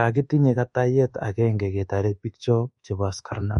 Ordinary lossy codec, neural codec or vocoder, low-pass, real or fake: MP3, 48 kbps; codec, 24 kHz, 1.2 kbps, DualCodec; 10.8 kHz; fake